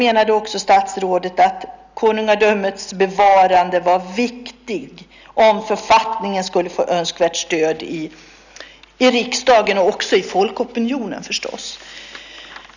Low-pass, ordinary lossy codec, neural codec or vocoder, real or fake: 7.2 kHz; none; none; real